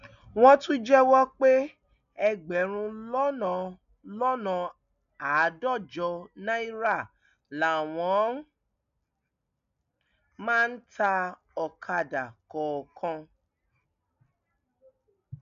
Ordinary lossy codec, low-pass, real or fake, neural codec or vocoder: none; 7.2 kHz; real; none